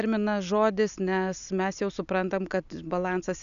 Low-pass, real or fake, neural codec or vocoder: 7.2 kHz; real; none